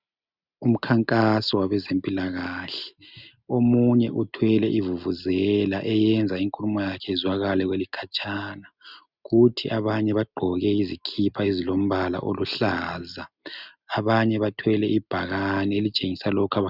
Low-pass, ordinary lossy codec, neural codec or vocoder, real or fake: 5.4 kHz; Opus, 64 kbps; none; real